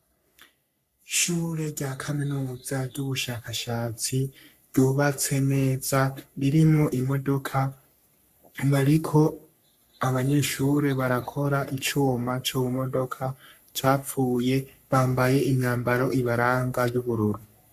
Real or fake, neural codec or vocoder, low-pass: fake; codec, 44.1 kHz, 3.4 kbps, Pupu-Codec; 14.4 kHz